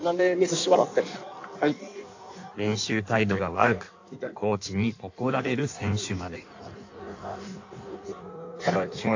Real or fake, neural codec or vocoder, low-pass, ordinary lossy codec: fake; codec, 16 kHz in and 24 kHz out, 1.1 kbps, FireRedTTS-2 codec; 7.2 kHz; AAC, 48 kbps